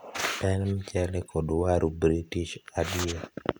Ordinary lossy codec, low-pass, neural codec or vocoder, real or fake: none; none; none; real